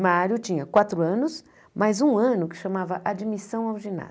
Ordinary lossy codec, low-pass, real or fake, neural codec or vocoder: none; none; real; none